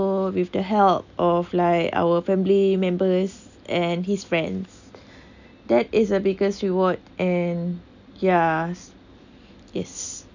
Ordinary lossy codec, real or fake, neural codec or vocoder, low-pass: none; real; none; 7.2 kHz